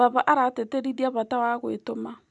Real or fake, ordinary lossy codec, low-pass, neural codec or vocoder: real; none; 10.8 kHz; none